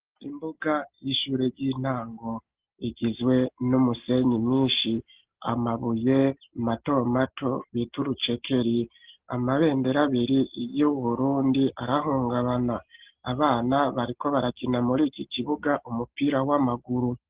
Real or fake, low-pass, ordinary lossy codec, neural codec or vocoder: real; 3.6 kHz; Opus, 16 kbps; none